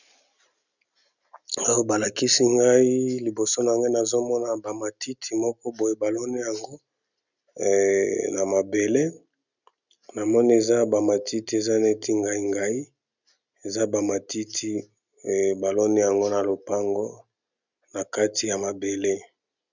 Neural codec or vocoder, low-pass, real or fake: none; 7.2 kHz; real